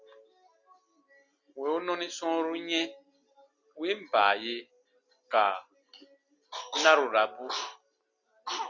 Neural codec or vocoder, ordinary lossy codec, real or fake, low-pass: none; Opus, 64 kbps; real; 7.2 kHz